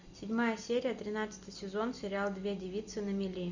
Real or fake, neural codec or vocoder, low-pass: real; none; 7.2 kHz